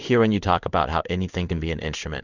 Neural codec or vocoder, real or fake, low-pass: codec, 16 kHz in and 24 kHz out, 1 kbps, XY-Tokenizer; fake; 7.2 kHz